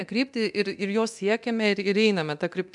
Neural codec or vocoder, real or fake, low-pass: codec, 24 kHz, 0.9 kbps, DualCodec; fake; 10.8 kHz